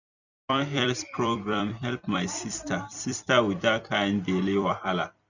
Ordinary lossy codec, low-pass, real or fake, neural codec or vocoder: none; 7.2 kHz; fake; vocoder, 44.1 kHz, 128 mel bands every 256 samples, BigVGAN v2